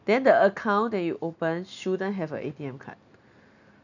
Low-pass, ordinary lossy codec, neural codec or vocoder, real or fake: 7.2 kHz; none; none; real